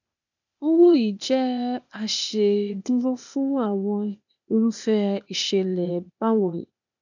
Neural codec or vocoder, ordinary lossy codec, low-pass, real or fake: codec, 16 kHz, 0.8 kbps, ZipCodec; none; 7.2 kHz; fake